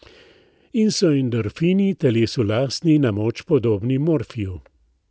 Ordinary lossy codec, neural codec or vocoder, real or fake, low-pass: none; none; real; none